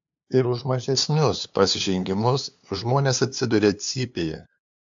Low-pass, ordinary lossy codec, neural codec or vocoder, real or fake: 7.2 kHz; AAC, 64 kbps; codec, 16 kHz, 2 kbps, FunCodec, trained on LibriTTS, 25 frames a second; fake